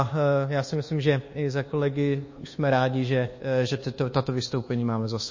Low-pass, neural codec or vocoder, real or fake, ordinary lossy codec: 7.2 kHz; codec, 24 kHz, 1.2 kbps, DualCodec; fake; MP3, 32 kbps